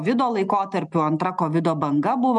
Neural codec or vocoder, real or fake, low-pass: none; real; 10.8 kHz